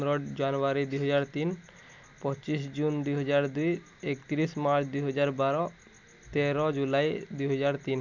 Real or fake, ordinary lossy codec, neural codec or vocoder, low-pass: real; none; none; 7.2 kHz